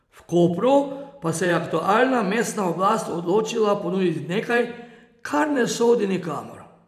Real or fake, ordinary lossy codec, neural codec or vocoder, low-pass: fake; none; vocoder, 44.1 kHz, 128 mel bands every 512 samples, BigVGAN v2; 14.4 kHz